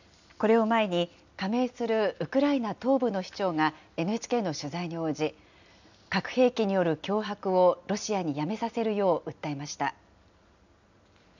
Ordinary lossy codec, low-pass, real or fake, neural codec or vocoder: none; 7.2 kHz; real; none